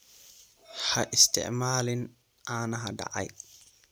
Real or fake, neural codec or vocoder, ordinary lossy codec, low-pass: fake; vocoder, 44.1 kHz, 128 mel bands every 256 samples, BigVGAN v2; none; none